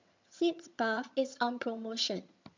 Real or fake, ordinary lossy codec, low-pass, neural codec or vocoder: fake; MP3, 64 kbps; 7.2 kHz; vocoder, 22.05 kHz, 80 mel bands, HiFi-GAN